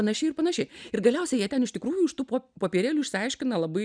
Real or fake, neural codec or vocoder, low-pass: real; none; 9.9 kHz